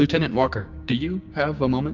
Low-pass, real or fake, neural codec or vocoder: 7.2 kHz; fake; codec, 16 kHz, 6 kbps, DAC